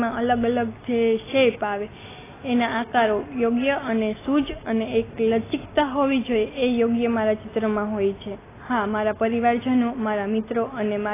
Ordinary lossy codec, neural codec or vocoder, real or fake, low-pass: AAC, 16 kbps; none; real; 3.6 kHz